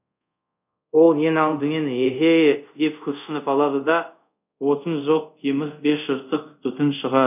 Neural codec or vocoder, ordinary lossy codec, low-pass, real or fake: codec, 24 kHz, 0.5 kbps, DualCodec; none; 3.6 kHz; fake